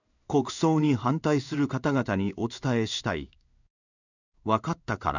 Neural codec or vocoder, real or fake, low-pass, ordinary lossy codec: codec, 16 kHz in and 24 kHz out, 1 kbps, XY-Tokenizer; fake; 7.2 kHz; none